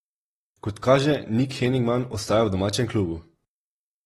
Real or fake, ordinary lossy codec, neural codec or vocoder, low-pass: real; AAC, 32 kbps; none; 19.8 kHz